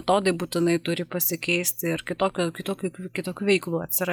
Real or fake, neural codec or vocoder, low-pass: real; none; 19.8 kHz